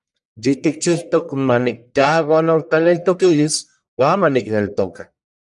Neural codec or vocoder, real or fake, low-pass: codec, 44.1 kHz, 1.7 kbps, Pupu-Codec; fake; 10.8 kHz